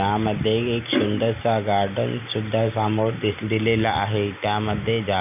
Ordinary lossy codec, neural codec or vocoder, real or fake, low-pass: none; none; real; 3.6 kHz